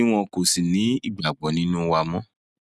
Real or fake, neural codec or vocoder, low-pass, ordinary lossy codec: real; none; none; none